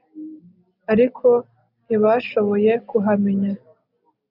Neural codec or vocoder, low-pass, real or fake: none; 5.4 kHz; real